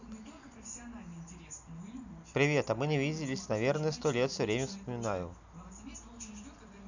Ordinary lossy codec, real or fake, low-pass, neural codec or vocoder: none; real; 7.2 kHz; none